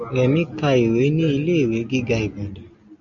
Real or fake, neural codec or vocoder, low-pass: real; none; 7.2 kHz